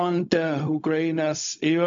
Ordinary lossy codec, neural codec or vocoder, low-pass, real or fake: MP3, 64 kbps; codec, 16 kHz, 0.4 kbps, LongCat-Audio-Codec; 7.2 kHz; fake